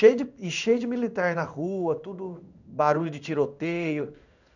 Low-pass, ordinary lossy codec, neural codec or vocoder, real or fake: 7.2 kHz; none; codec, 16 kHz in and 24 kHz out, 1 kbps, XY-Tokenizer; fake